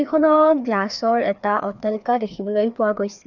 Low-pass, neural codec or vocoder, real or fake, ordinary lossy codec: 7.2 kHz; codec, 16 kHz, 2 kbps, FreqCodec, larger model; fake; none